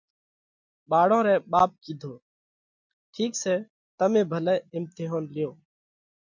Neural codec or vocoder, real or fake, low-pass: none; real; 7.2 kHz